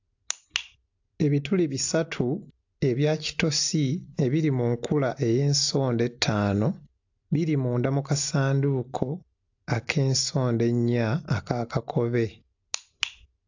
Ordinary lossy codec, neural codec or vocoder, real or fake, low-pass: AAC, 48 kbps; none; real; 7.2 kHz